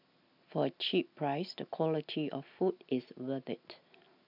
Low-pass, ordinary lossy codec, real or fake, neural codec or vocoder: 5.4 kHz; none; real; none